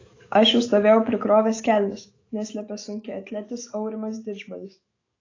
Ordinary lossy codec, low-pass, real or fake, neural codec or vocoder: AAC, 32 kbps; 7.2 kHz; fake; autoencoder, 48 kHz, 128 numbers a frame, DAC-VAE, trained on Japanese speech